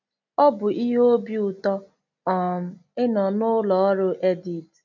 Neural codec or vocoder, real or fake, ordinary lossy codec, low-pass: none; real; none; 7.2 kHz